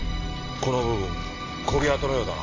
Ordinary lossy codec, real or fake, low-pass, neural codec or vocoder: AAC, 32 kbps; real; 7.2 kHz; none